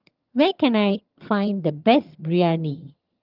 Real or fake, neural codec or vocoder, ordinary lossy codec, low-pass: fake; vocoder, 22.05 kHz, 80 mel bands, HiFi-GAN; Opus, 24 kbps; 5.4 kHz